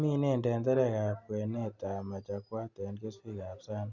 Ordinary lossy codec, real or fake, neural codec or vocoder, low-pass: Opus, 64 kbps; real; none; 7.2 kHz